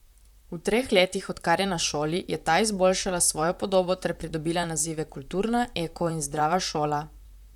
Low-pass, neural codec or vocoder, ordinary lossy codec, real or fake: 19.8 kHz; vocoder, 44.1 kHz, 128 mel bands, Pupu-Vocoder; none; fake